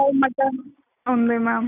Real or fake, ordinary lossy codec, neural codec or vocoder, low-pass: real; none; none; 3.6 kHz